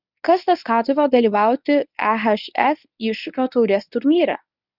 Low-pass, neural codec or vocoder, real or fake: 5.4 kHz; codec, 24 kHz, 0.9 kbps, WavTokenizer, medium speech release version 1; fake